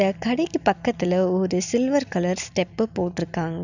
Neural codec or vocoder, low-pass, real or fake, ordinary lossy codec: none; 7.2 kHz; real; none